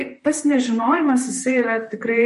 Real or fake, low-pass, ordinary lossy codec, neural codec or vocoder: fake; 14.4 kHz; MP3, 48 kbps; vocoder, 44.1 kHz, 128 mel bands, Pupu-Vocoder